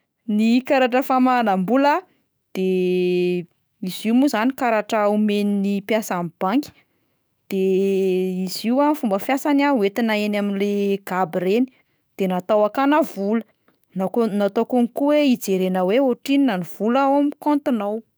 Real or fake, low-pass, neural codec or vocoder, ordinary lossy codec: fake; none; autoencoder, 48 kHz, 128 numbers a frame, DAC-VAE, trained on Japanese speech; none